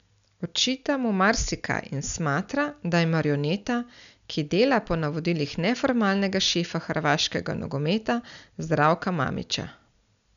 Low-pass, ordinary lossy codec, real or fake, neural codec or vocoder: 7.2 kHz; none; real; none